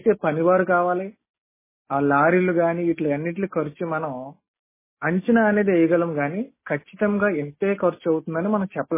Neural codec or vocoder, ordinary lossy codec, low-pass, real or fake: none; MP3, 16 kbps; 3.6 kHz; real